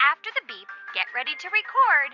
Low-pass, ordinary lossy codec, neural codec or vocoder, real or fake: 7.2 kHz; Opus, 64 kbps; vocoder, 44.1 kHz, 128 mel bands every 256 samples, BigVGAN v2; fake